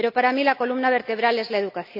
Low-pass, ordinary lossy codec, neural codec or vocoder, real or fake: 5.4 kHz; none; none; real